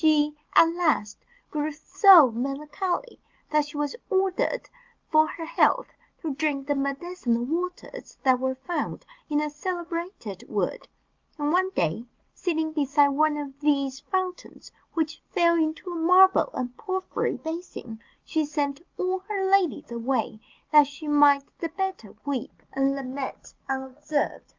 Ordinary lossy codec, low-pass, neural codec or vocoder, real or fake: Opus, 24 kbps; 7.2 kHz; none; real